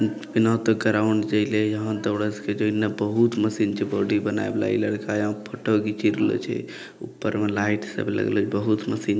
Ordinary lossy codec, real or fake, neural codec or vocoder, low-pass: none; real; none; none